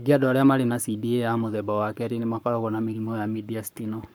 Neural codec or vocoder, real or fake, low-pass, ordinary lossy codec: codec, 44.1 kHz, 7.8 kbps, Pupu-Codec; fake; none; none